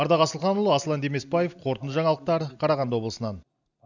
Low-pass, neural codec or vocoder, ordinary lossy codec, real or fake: 7.2 kHz; none; none; real